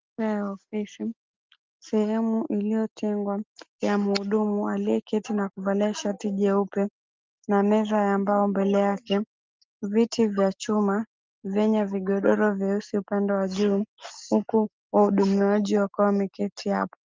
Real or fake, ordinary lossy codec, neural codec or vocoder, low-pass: real; Opus, 24 kbps; none; 7.2 kHz